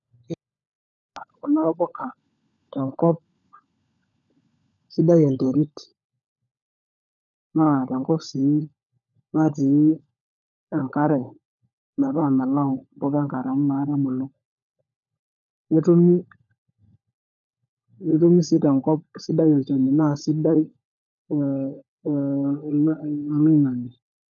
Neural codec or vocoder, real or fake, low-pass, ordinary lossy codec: codec, 16 kHz, 16 kbps, FunCodec, trained on LibriTTS, 50 frames a second; fake; 7.2 kHz; none